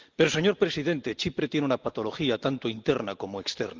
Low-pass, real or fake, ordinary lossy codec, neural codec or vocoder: 7.2 kHz; real; Opus, 32 kbps; none